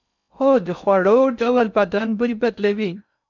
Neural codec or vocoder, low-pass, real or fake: codec, 16 kHz in and 24 kHz out, 0.6 kbps, FocalCodec, streaming, 4096 codes; 7.2 kHz; fake